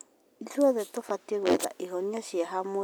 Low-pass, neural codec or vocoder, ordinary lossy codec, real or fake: none; none; none; real